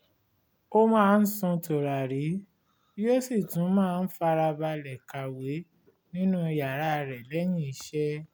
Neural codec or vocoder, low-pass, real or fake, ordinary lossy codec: none; none; real; none